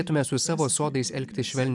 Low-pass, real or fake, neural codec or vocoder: 10.8 kHz; real; none